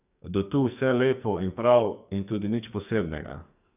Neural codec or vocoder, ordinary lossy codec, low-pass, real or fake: codec, 44.1 kHz, 2.6 kbps, SNAC; none; 3.6 kHz; fake